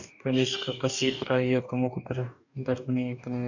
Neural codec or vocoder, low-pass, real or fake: codec, 44.1 kHz, 2.6 kbps, DAC; 7.2 kHz; fake